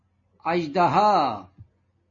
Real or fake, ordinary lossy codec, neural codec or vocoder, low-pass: real; MP3, 32 kbps; none; 7.2 kHz